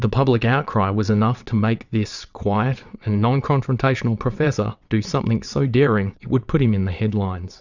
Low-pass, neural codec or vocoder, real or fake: 7.2 kHz; vocoder, 22.05 kHz, 80 mel bands, WaveNeXt; fake